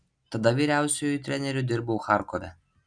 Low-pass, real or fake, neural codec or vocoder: 9.9 kHz; real; none